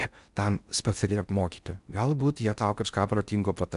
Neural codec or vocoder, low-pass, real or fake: codec, 16 kHz in and 24 kHz out, 0.6 kbps, FocalCodec, streaming, 4096 codes; 10.8 kHz; fake